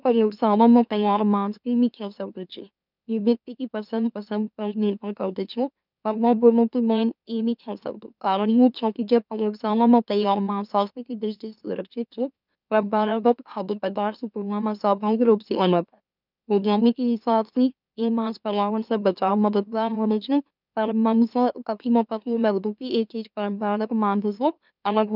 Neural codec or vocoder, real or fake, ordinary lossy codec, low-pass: autoencoder, 44.1 kHz, a latent of 192 numbers a frame, MeloTTS; fake; none; 5.4 kHz